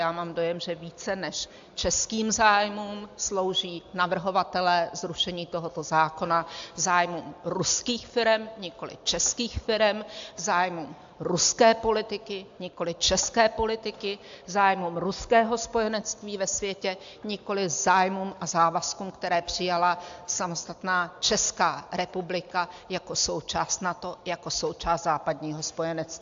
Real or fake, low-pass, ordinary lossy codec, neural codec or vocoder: real; 7.2 kHz; AAC, 64 kbps; none